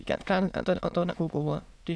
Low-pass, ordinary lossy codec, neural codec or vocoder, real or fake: none; none; autoencoder, 22.05 kHz, a latent of 192 numbers a frame, VITS, trained on many speakers; fake